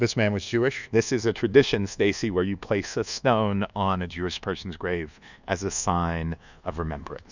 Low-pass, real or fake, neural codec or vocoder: 7.2 kHz; fake; codec, 24 kHz, 1.2 kbps, DualCodec